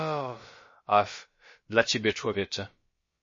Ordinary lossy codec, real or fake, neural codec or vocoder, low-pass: MP3, 32 kbps; fake; codec, 16 kHz, about 1 kbps, DyCAST, with the encoder's durations; 7.2 kHz